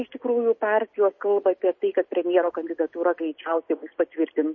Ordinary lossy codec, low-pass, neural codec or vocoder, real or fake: MP3, 32 kbps; 7.2 kHz; none; real